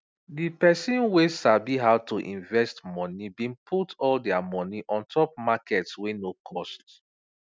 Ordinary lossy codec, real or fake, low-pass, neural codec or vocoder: none; real; none; none